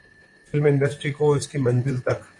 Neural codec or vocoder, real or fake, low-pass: vocoder, 44.1 kHz, 128 mel bands, Pupu-Vocoder; fake; 10.8 kHz